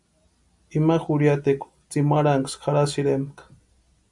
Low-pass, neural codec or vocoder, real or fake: 10.8 kHz; none; real